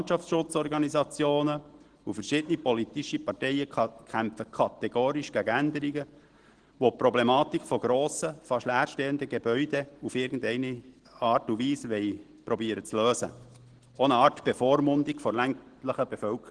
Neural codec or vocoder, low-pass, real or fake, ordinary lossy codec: none; 9.9 kHz; real; Opus, 16 kbps